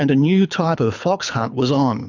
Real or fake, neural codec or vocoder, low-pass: fake; codec, 24 kHz, 6 kbps, HILCodec; 7.2 kHz